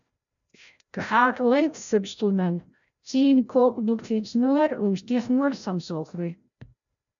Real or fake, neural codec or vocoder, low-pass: fake; codec, 16 kHz, 0.5 kbps, FreqCodec, larger model; 7.2 kHz